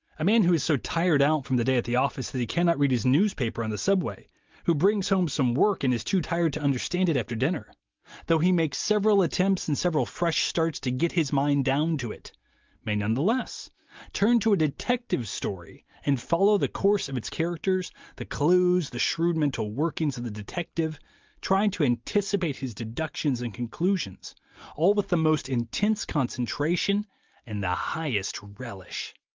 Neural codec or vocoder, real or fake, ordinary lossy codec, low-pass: none; real; Opus, 32 kbps; 7.2 kHz